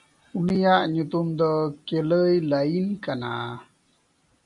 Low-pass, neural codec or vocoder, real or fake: 10.8 kHz; none; real